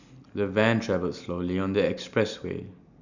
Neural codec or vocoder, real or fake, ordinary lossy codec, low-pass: none; real; none; 7.2 kHz